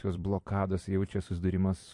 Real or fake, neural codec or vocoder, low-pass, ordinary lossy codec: fake; vocoder, 44.1 kHz, 128 mel bands every 256 samples, BigVGAN v2; 10.8 kHz; MP3, 48 kbps